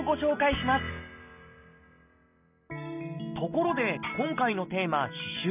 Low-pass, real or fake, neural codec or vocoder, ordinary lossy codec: 3.6 kHz; real; none; none